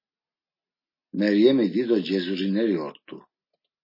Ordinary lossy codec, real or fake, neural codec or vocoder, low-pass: MP3, 24 kbps; real; none; 5.4 kHz